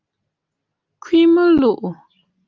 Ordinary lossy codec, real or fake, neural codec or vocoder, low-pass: Opus, 24 kbps; real; none; 7.2 kHz